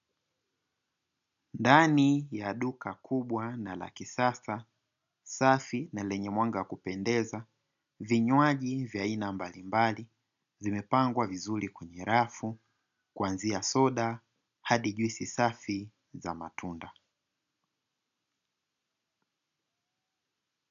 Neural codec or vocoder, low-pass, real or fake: none; 7.2 kHz; real